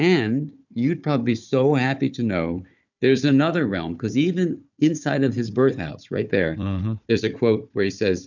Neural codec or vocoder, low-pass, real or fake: codec, 16 kHz, 4 kbps, FunCodec, trained on Chinese and English, 50 frames a second; 7.2 kHz; fake